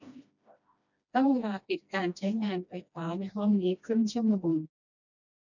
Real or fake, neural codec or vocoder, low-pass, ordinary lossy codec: fake; codec, 16 kHz, 1 kbps, FreqCodec, smaller model; 7.2 kHz; none